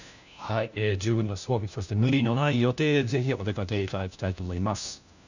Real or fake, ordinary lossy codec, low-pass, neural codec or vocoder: fake; none; 7.2 kHz; codec, 16 kHz, 1 kbps, FunCodec, trained on LibriTTS, 50 frames a second